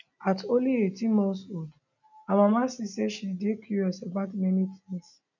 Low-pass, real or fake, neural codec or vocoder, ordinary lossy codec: 7.2 kHz; real; none; none